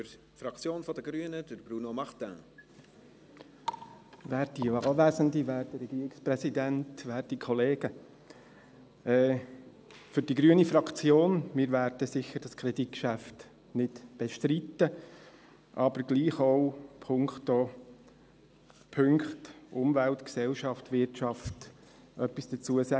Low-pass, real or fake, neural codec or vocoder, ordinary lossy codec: none; real; none; none